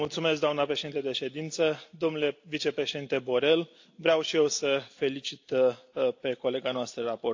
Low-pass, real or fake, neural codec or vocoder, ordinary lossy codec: 7.2 kHz; real; none; AAC, 48 kbps